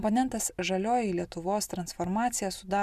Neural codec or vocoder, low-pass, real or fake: none; 14.4 kHz; real